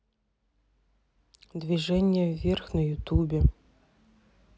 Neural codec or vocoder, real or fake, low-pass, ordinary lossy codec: none; real; none; none